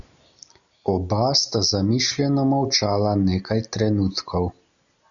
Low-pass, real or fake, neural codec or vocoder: 7.2 kHz; real; none